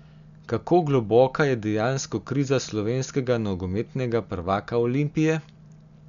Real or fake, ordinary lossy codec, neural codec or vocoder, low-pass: real; none; none; 7.2 kHz